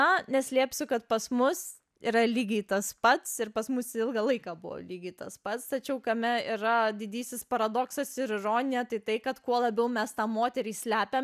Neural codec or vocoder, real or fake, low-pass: none; real; 14.4 kHz